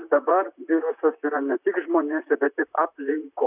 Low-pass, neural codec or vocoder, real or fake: 3.6 kHz; vocoder, 44.1 kHz, 128 mel bands, Pupu-Vocoder; fake